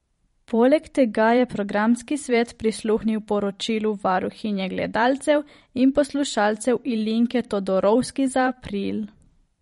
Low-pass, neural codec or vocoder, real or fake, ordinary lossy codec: 19.8 kHz; vocoder, 44.1 kHz, 128 mel bands every 512 samples, BigVGAN v2; fake; MP3, 48 kbps